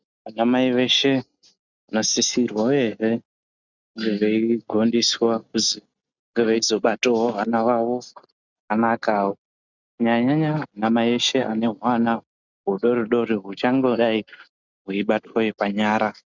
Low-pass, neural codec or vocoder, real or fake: 7.2 kHz; none; real